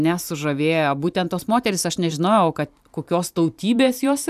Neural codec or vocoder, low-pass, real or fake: vocoder, 44.1 kHz, 128 mel bands every 512 samples, BigVGAN v2; 14.4 kHz; fake